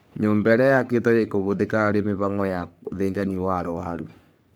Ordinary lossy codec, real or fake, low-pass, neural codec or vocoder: none; fake; none; codec, 44.1 kHz, 3.4 kbps, Pupu-Codec